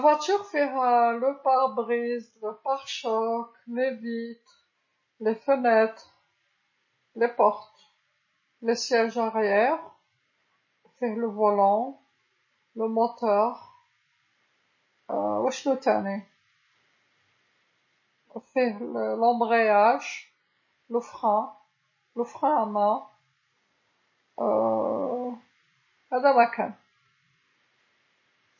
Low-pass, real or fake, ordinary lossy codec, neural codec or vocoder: 7.2 kHz; real; MP3, 32 kbps; none